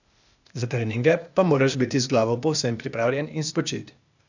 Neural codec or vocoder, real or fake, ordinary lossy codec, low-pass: codec, 16 kHz, 0.8 kbps, ZipCodec; fake; none; 7.2 kHz